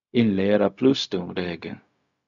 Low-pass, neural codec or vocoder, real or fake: 7.2 kHz; codec, 16 kHz, 0.4 kbps, LongCat-Audio-Codec; fake